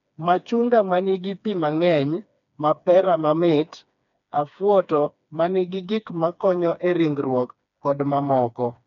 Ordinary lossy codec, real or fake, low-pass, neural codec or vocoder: MP3, 96 kbps; fake; 7.2 kHz; codec, 16 kHz, 2 kbps, FreqCodec, smaller model